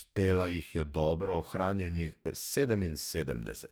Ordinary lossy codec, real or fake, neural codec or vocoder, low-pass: none; fake; codec, 44.1 kHz, 2.6 kbps, DAC; none